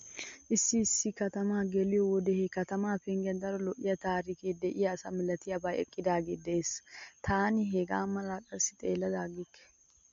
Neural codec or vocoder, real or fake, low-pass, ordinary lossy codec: none; real; 7.2 kHz; MP3, 48 kbps